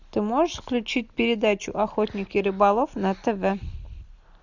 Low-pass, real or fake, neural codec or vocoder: 7.2 kHz; real; none